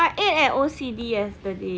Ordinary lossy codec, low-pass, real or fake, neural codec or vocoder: none; none; real; none